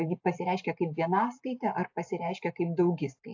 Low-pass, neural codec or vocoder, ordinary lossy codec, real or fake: 7.2 kHz; none; AAC, 48 kbps; real